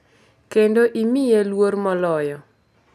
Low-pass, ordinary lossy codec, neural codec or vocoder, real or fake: 14.4 kHz; none; none; real